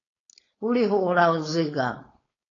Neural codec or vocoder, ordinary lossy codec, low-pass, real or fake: codec, 16 kHz, 4.8 kbps, FACodec; AAC, 32 kbps; 7.2 kHz; fake